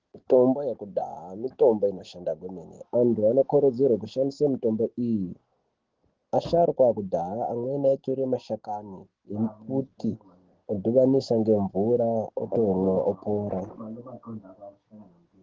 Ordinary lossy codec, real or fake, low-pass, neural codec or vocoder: Opus, 16 kbps; real; 7.2 kHz; none